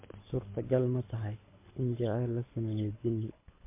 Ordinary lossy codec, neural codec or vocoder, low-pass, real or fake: MP3, 24 kbps; codec, 24 kHz, 6 kbps, HILCodec; 3.6 kHz; fake